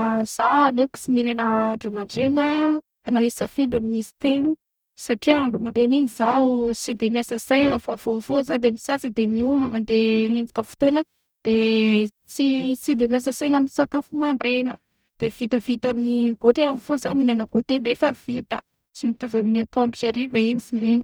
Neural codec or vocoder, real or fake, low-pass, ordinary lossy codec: codec, 44.1 kHz, 0.9 kbps, DAC; fake; none; none